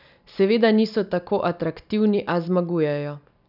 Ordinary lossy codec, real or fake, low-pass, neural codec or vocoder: none; real; 5.4 kHz; none